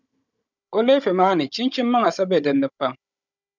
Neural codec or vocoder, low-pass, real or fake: codec, 16 kHz, 16 kbps, FunCodec, trained on Chinese and English, 50 frames a second; 7.2 kHz; fake